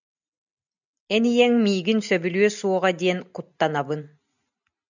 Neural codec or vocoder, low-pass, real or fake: none; 7.2 kHz; real